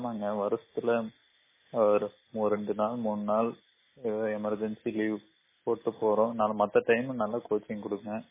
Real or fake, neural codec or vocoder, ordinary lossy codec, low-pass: real; none; MP3, 16 kbps; 3.6 kHz